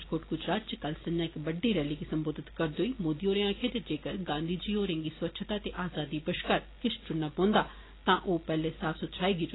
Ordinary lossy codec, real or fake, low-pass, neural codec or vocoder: AAC, 16 kbps; real; 7.2 kHz; none